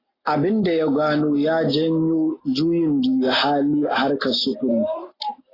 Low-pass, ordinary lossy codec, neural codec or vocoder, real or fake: 5.4 kHz; AAC, 24 kbps; none; real